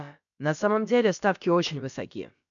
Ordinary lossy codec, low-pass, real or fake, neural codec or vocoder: MP3, 96 kbps; 7.2 kHz; fake; codec, 16 kHz, about 1 kbps, DyCAST, with the encoder's durations